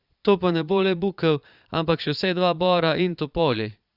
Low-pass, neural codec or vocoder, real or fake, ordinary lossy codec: 5.4 kHz; vocoder, 22.05 kHz, 80 mel bands, Vocos; fake; Opus, 64 kbps